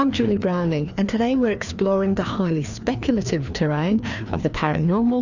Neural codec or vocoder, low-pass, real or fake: codec, 16 kHz, 2 kbps, FreqCodec, larger model; 7.2 kHz; fake